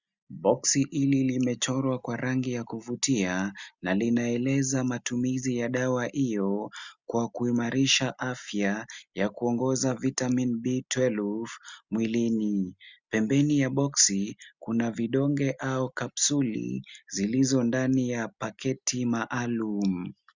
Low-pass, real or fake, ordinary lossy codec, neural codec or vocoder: 7.2 kHz; real; Opus, 64 kbps; none